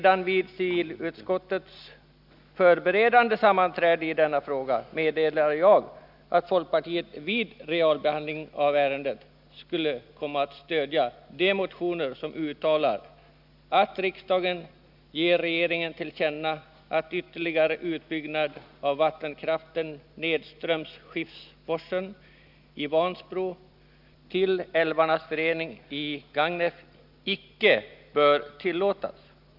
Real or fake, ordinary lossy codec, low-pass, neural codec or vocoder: real; none; 5.4 kHz; none